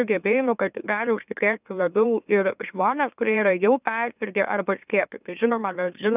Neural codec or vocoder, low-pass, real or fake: autoencoder, 44.1 kHz, a latent of 192 numbers a frame, MeloTTS; 3.6 kHz; fake